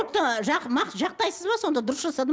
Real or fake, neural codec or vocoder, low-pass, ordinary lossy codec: real; none; none; none